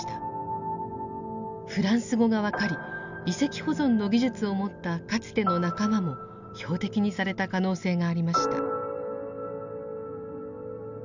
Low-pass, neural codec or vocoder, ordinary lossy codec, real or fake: 7.2 kHz; none; none; real